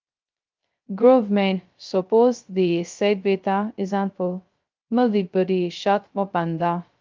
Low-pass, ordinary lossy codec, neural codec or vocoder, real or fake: 7.2 kHz; Opus, 32 kbps; codec, 16 kHz, 0.2 kbps, FocalCodec; fake